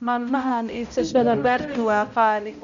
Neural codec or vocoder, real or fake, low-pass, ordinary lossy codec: codec, 16 kHz, 0.5 kbps, X-Codec, HuBERT features, trained on balanced general audio; fake; 7.2 kHz; none